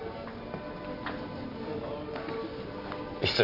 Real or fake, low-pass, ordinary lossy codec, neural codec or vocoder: real; 5.4 kHz; Opus, 64 kbps; none